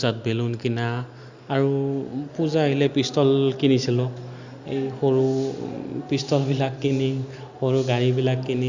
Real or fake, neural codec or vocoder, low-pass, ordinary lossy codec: real; none; 7.2 kHz; Opus, 64 kbps